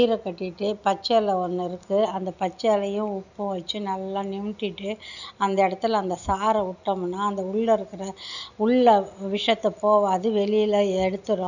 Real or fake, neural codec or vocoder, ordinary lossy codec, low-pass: real; none; none; 7.2 kHz